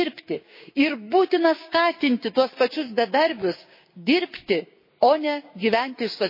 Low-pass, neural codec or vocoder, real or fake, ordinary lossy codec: 5.4 kHz; codec, 16 kHz, 6 kbps, DAC; fake; MP3, 24 kbps